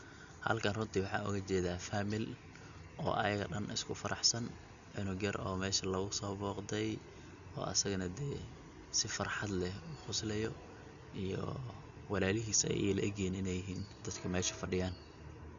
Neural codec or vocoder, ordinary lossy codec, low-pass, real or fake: none; none; 7.2 kHz; real